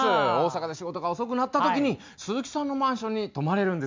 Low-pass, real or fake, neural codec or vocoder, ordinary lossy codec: 7.2 kHz; real; none; MP3, 64 kbps